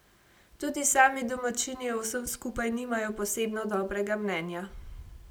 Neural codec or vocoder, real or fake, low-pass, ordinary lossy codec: none; real; none; none